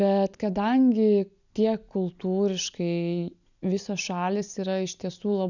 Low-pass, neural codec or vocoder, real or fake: 7.2 kHz; none; real